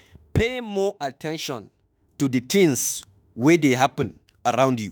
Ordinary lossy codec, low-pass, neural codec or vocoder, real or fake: none; none; autoencoder, 48 kHz, 32 numbers a frame, DAC-VAE, trained on Japanese speech; fake